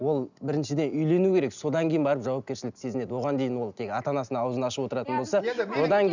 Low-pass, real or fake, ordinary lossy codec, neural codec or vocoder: 7.2 kHz; real; none; none